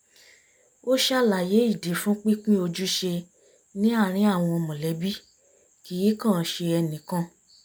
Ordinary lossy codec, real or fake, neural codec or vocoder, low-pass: none; real; none; none